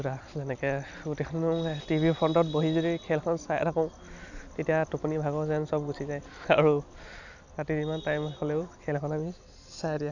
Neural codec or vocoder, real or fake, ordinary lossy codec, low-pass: none; real; none; 7.2 kHz